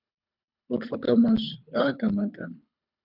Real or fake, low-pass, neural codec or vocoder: fake; 5.4 kHz; codec, 24 kHz, 3 kbps, HILCodec